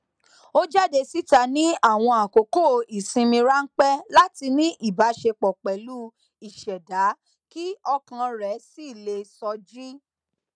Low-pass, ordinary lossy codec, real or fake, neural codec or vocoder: 9.9 kHz; none; real; none